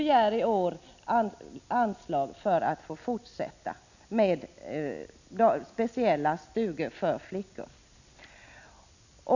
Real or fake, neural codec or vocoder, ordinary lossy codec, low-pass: real; none; none; 7.2 kHz